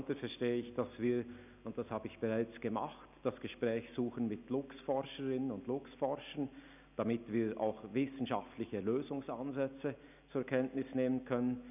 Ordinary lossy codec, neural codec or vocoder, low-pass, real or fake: none; none; 3.6 kHz; real